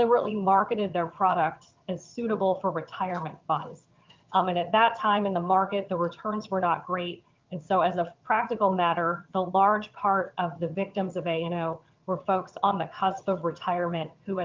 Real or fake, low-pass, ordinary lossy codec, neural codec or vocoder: fake; 7.2 kHz; Opus, 24 kbps; vocoder, 22.05 kHz, 80 mel bands, HiFi-GAN